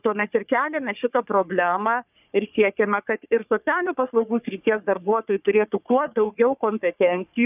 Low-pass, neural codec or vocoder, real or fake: 3.6 kHz; codec, 44.1 kHz, 3.4 kbps, Pupu-Codec; fake